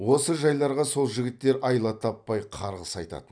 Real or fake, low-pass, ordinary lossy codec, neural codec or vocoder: real; 9.9 kHz; none; none